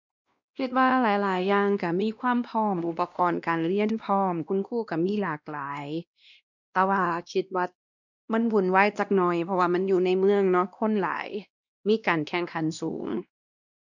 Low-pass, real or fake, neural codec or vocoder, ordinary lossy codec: 7.2 kHz; fake; codec, 16 kHz, 1 kbps, X-Codec, WavLM features, trained on Multilingual LibriSpeech; none